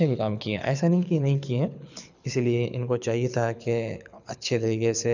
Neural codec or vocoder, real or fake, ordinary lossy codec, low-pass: codec, 24 kHz, 6 kbps, HILCodec; fake; none; 7.2 kHz